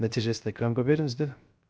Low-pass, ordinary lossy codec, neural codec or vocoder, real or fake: none; none; codec, 16 kHz, 0.3 kbps, FocalCodec; fake